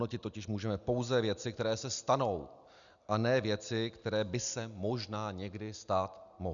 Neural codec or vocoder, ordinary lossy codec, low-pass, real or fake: none; MP3, 96 kbps; 7.2 kHz; real